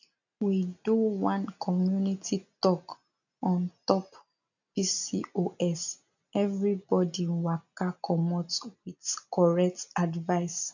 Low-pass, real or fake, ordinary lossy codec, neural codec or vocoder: 7.2 kHz; real; none; none